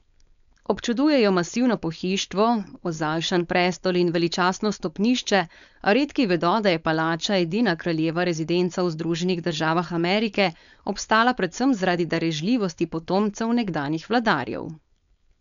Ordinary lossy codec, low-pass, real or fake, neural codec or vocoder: none; 7.2 kHz; fake; codec, 16 kHz, 4.8 kbps, FACodec